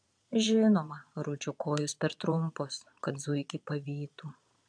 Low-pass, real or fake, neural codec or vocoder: 9.9 kHz; fake; vocoder, 22.05 kHz, 80 mel bands, Vocos